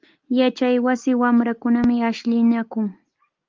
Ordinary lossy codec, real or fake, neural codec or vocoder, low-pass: Opus, 32 kbps; real; none; 7.2 kHz